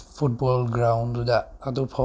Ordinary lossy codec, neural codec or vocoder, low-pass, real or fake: none; none; none; real